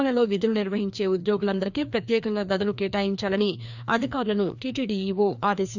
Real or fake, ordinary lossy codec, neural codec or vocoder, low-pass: fake; none; codec, 16 kHz, 2 kbps, FreqCodec, larger model; 7.2 kHz